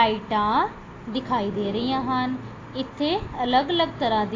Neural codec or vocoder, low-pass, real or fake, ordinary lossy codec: none; 7.2 kHz; real; AAC, 32 kbps